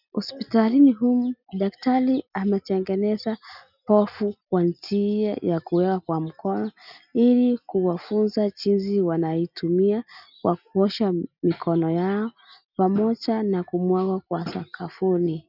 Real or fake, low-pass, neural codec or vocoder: real; 5.4 kHz; none